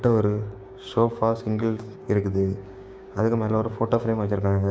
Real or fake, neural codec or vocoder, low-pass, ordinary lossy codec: fake; codec, 16 kHz, 6 kbps, DAC; none; none